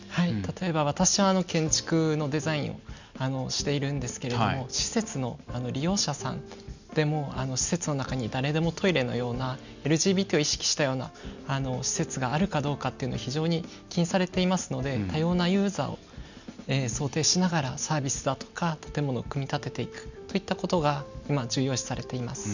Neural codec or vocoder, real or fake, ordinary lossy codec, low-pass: none; real; none; 7.2 kHz